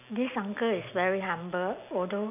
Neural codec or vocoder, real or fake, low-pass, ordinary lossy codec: none; real; 3.6 kHz; none